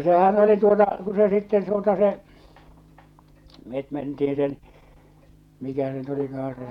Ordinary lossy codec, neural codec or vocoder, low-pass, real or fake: none; vocoder, 48 kHz, 128 mel bands, Vocos; 19.8 kHz; fake